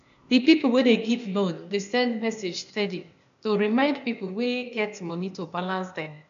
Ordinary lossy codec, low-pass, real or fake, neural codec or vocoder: MP3, 96 kbps; 7.2 kHz; fake; codec, 16 kHz, 0.8 kbps, ZipCodec